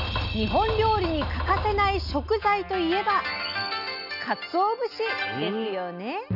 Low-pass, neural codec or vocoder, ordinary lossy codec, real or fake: 5.4 kHz; none; none; real